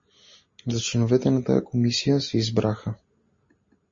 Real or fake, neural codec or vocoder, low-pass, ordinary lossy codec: real; none; 7.2 kHz; MP3, 32 kbps